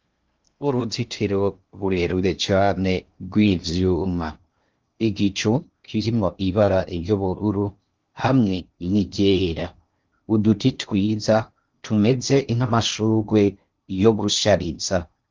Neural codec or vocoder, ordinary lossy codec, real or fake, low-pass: codec, 16 kHz in and 24 kHz out, 0.6 kbps, FocalCodec, streaming, 2048 codes; Opus, 24 kbps; fake; 7.2 kHz